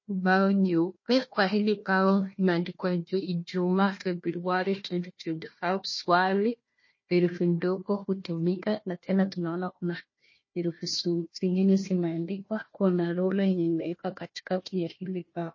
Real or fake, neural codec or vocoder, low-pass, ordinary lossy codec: fake; codec, 16 kHz, 1 kbps, FunCodec, trained on Chinese and English, 50 frames a second; 7.2 kHz; MP3, 32 kbps